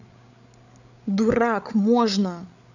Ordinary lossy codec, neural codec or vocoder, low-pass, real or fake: none; codec, 16 kHz, 8 kbps, FreqCodec, larger model; 7.2 kHz; fake